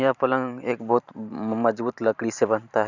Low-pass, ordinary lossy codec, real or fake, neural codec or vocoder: 7.2 kHz; none; fake; vocoder, 44.1 kHz, 128 mel bands every 512 samples, BigVGAN v2